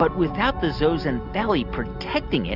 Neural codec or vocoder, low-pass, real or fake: none; 5.4 kHz; real